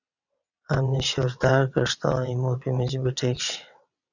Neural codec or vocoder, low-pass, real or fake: vocoder, 22.05 kHz, 80 mel bands, WaveNeXt; 7.2 kHz; fake